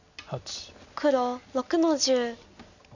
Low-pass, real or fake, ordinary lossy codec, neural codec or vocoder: 7.2 kHz; real; none; none